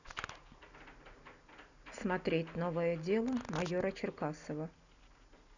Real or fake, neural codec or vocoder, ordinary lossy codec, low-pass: real; none; none; 7.2 kHz